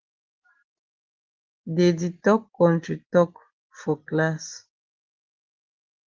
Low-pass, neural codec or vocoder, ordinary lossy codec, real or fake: 7.2 kHz; none; Opus, 16 kbps; real